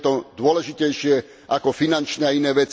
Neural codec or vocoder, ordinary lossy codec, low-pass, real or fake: none; none; none; real